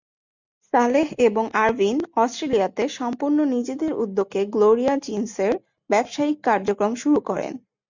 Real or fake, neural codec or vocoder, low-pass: real; none; 7.2 kHz